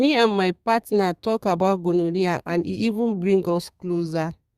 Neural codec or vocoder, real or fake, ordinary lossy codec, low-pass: codec, 32 kHz, 1.9 kbps, SNAC; fake; Opus, 64 kbps; 14.4 kHz